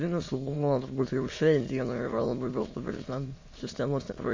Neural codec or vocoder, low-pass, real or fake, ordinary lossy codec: autoencoder, 22.05 kHz, a latent of 192 numbers a frame, VITS, trained on many speakers; 7.2 kHz; fake; MP3, 32 kbps